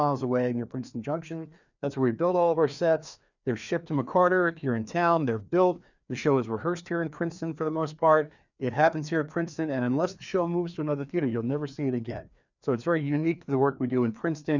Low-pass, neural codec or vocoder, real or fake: 7.2 kHz; codec, 16 kHz, 2 kbps, FreqCodec, larger model; fake